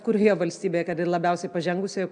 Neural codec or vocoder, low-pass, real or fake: none; 9.9 kHz; real